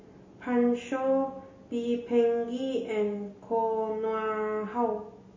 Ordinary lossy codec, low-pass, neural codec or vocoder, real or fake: MP3, 32 kbps; 7.2 kHz; none; real